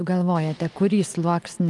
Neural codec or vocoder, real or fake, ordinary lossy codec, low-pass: none; real; Opus, 32 kbps; 10.8 kHz